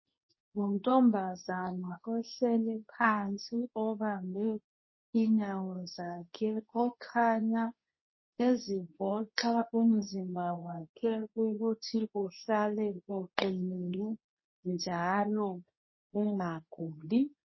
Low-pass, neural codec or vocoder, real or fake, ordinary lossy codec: 7.2 kHz; codec, 24 kHz, 0.9 kbps, WavTokenizer, medium speech release version 2; fake; MP3, 24 kbps